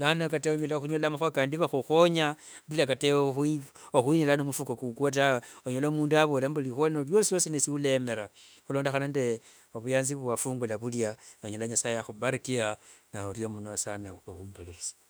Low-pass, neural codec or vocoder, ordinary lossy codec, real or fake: none; autoencoder, 48 kHz, 32 numbers a frame, DAC-VAE, trained on Japanese speech; none; fake